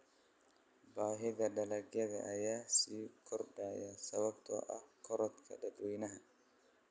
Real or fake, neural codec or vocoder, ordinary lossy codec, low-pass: real; none; none; none